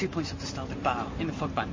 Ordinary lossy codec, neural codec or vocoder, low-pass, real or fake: MP3, 32 kbps; none; 7.2 kHz; real